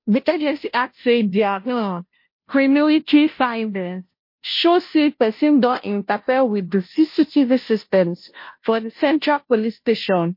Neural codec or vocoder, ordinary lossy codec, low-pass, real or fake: codec, 16 kHz, 0.5 kbps, FunCodec, trained on Chinese and English, 25 frames a second; MP3, 32 kbps; 5.4 kHz; fake